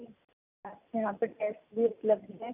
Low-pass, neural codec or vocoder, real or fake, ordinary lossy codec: 3.6 kHz; none; real; none